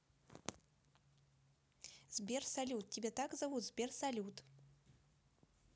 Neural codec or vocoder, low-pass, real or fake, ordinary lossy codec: none; none; real; none